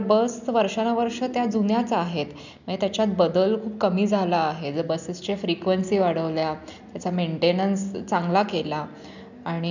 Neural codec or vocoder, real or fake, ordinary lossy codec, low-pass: none; real; none; 7.2 kHz